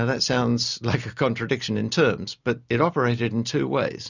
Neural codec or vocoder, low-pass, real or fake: none; 7.2 kHz; real